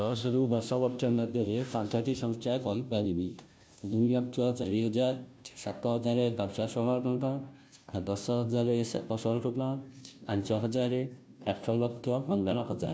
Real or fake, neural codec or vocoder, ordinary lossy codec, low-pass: fake; codec, 16 kHz, 0.5 kbps, FunCodec, trained on Chinese and English, 25 frames a second; none; none